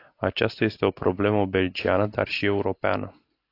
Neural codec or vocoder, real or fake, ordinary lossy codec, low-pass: none; real; AAC, 32 kbps; 5.4 kHz